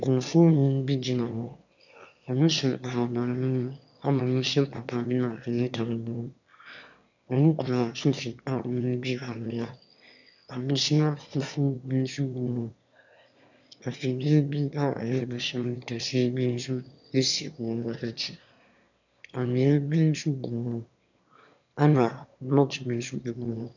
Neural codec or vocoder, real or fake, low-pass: autoencoder, 22.05 kHz, a latent of 192 numbers a frame, VITS, trained on one speaker; fake; 7.2 kHz